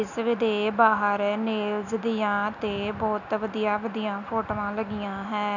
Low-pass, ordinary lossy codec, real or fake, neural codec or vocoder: 7.2 kHz; none; real; none